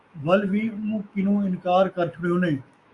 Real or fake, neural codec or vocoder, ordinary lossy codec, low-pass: fake; autoencoder, 48 kHz, 128 numbers a frame, DAC-VAE, trained on Japanese speech; Opus, 64 kbps; 10.8 kHz